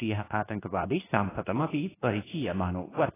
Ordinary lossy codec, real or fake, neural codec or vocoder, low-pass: AAC, 16 kbps; fake; codec, 24 kHz, 0.9 kbps, WavTokenizer, medium speech release version 2; 3.6 kHz